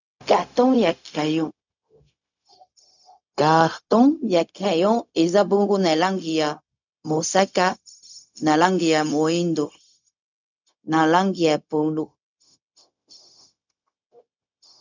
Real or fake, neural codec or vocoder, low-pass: fake; codec, 16 kHz, 0.4 kbps, LongCat-Audio-Codec; 7.2 kHz